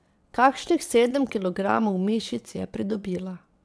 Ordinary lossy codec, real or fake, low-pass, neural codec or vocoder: none; fake; none; vocoder, 22.05 kHz, 80 mel bands, WaveNeXt